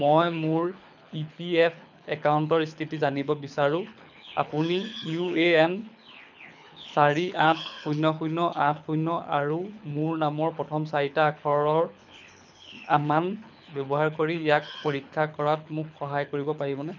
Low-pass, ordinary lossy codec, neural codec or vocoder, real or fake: 7.2 kHz; none; codec, 24 kHz, 6 kbps, HILCodec; fake